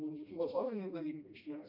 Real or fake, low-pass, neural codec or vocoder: fake; 5.4 kHz; codec, 16 kHz, 1 kbps, FreqCodec, smaller model